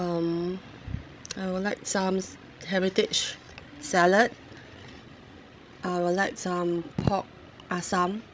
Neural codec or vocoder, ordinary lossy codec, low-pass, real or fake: codec, 16 kHz, 16 kbps, FreqCodec, larger model; none; none; fake